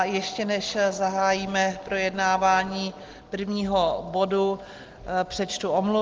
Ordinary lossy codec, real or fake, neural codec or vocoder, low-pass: Opus, 16 kbps; real; none; 7.2 kHz